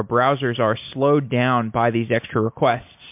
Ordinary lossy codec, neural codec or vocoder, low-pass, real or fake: MP3, 32 kbps; none; 3.6 kHz; real